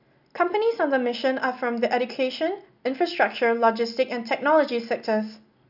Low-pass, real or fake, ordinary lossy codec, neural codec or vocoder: 5.4 kHz; real; none; none